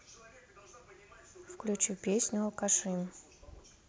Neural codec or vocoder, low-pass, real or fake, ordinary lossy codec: none; none; real; none